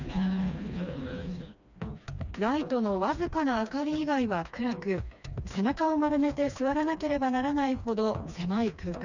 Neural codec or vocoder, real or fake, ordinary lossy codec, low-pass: codec, 16 kHz, 2 kbps, FreqCodec, smaller model; fake; none; 7.2 kHz